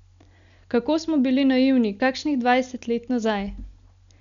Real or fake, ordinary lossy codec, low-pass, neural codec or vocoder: real; none; 7.2 kHz; none